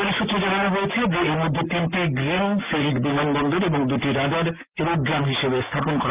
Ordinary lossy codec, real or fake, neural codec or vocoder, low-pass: Opus, 32 kbps; real; none; 3.6 kHz